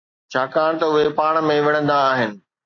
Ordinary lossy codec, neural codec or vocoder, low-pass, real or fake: AAC, 48 kbps; none; 7.2 kHz; real